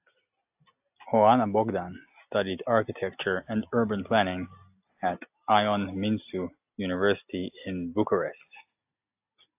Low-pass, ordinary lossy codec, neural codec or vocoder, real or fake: 3.6 kHz; AAC, 32 kbps; none; real